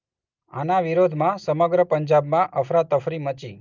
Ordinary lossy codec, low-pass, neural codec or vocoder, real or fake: Opus, 24 kbps; 7.2 kHz; none; real